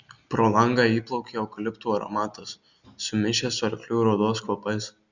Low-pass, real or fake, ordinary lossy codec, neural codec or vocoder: 7.2 kHz; real; Opus, 64 kbps; none